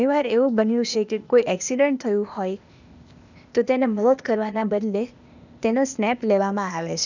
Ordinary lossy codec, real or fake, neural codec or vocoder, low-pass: none; fake; codec, 16 kHz, 0.8 kbps, ZipCodec; 7.2 kHz